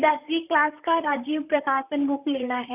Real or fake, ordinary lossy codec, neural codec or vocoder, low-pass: fake; none; vocoder, 44.1 kHz, 128 mel bands, Pupu-Vocoder; 3.6 kHz